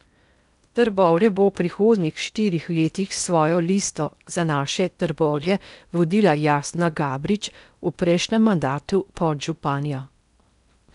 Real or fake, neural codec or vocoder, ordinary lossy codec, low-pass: fake; codec, 16 kHz in and 24 kHz out, 0.8 kbps, FocalCodec, streaming, 65536 codes; none; 10.8 kHz